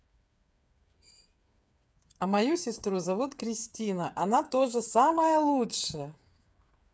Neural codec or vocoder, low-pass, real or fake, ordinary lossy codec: codec, 16 kHz, 8 kbps, FreqCodec, smaller model; none; fake; none